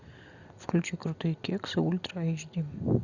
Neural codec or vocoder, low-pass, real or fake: none; 7.2 kHz; real